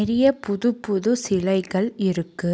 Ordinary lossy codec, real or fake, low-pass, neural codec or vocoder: none; real; none; none